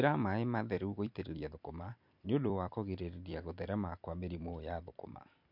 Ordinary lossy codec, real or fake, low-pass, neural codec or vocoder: MP3, 48 kbps; fake; 5.4 kHz; vocoder, 44.1 kHz, 128 mel bands every 256 samples, BigVGAN v2